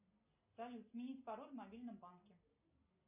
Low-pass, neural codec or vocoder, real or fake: 3.6 kHz; none; real